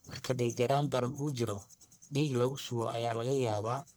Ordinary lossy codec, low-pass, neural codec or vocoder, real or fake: none; none; codec, 44.1 kHz, 1.7 kbps, Pupu-Codec; fake